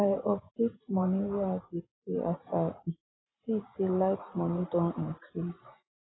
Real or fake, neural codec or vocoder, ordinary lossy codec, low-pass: fake; vocoder, 44.1 kHz, 128 mel bands every 512 samples, BigVGAN v2; AAC, 16 kbps; 7.2 kHz